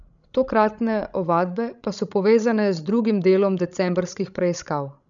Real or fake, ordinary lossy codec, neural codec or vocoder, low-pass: fake; none; codec, 16 kHz, 16 kbps, FreqCodec, larger model; 7.2 kHz